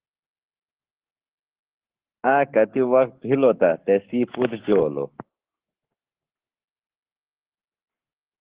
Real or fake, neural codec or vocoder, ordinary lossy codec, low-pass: fake; codec, 44.1 kHz, 7.8 kbps, Pupu-Codec; Opus, 16 kbps; 3.6 kHz